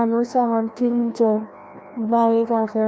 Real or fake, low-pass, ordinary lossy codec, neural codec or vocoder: fake; none; none; codec, 16 kHz, 1 kbps, FreqCodec, larger model